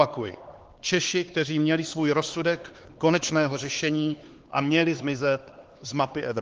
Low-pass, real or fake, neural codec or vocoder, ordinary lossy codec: 7.2 kHz; fake; codec, 16 kHz, 4 kbps, X-Codec, HuBERT features, trained on LibriSpeech; Opus, 16 kbps